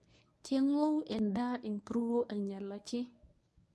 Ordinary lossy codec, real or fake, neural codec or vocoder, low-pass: none; fake; codec, 24 kHz, 0.9 kbps, WavTokenizer, medium speech release version 1; none